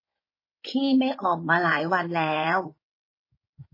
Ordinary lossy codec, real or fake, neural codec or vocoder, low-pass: MP3, 24 kbps; fake; codec, 16 kHz in and 24 kHz out, 2.2 kbps, FireRedTTS-2 codec; 5.4 kHz